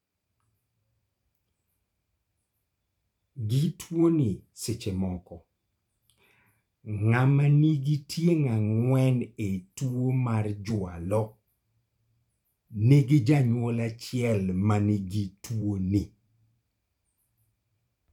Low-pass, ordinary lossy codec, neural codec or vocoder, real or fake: 19.8 kHz; none; vocoder, 44.1 kHz, 128 mel bands every 512 samples, BigVGAN v2; fake